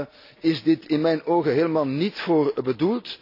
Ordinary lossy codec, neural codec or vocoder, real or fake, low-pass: AAC, 32 kbps; none; real; 5.4 kHz